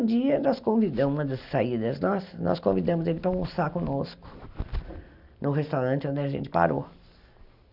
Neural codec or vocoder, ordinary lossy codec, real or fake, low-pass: none; none; real; 5.4 kHz